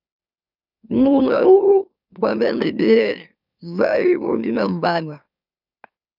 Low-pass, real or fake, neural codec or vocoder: 5.4 kHz; fake; autoencoder, 44.1 kHz, a latent of 192 numbers a frame, MeloTTS